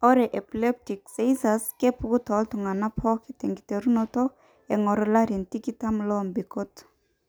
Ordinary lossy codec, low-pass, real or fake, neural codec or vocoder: none; none; real; none